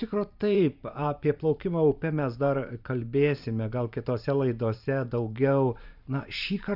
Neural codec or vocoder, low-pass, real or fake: vocoder, 44.1 kHz, 128 mel bands every 512 samples, BigVGAN v2; 5.4 kHz; fake